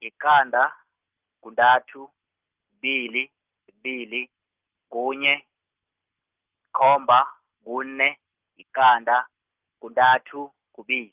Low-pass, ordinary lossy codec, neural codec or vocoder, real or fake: 3.6 kHz; Opus, 24 kbps; none; real